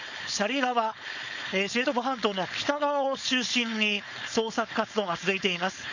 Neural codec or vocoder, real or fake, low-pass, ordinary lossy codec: codec, 16 kHz, 4.8 kbps, FACodec; fake; 7.2 kHz; none